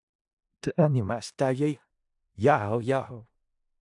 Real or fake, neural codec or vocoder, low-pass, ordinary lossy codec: fake; codec, 16 kHz in and 24 kHz out, 0.4 kbps, LongCat-Audio-Codec, four codebook decoder; 10.8 kHz; AAC, 64 kbps